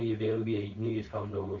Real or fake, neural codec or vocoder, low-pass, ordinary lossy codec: fake; codec, 16 kHz, 4.8 kbps, FACodec; 7.2 kHz; Opus, 64 kbps